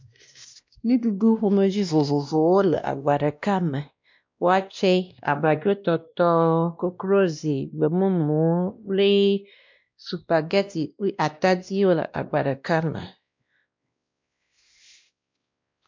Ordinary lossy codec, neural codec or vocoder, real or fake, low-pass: MP3, 64 kbps; codec, 16 kHz, 1 kbps, X-Codec, WavLM features, trained on Multilingual LibriSpeech; fake; 7.2 kHz